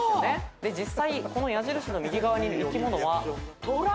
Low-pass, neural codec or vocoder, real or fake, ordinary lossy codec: none; none; real; none